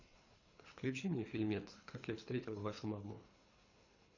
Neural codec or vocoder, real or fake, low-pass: codec, 24 kHz, 3 kbps, HILCodec; fake; 7.2 kHz